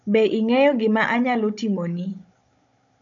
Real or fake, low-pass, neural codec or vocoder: fake; 7.2 kHz; codec, 16 kHz, 16 kbps, FunCodec, trained on Chinese and English, 50 frames a second